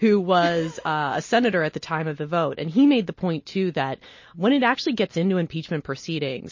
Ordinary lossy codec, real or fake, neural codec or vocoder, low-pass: MP3, 32 kbps; real; none; 7.2 kHz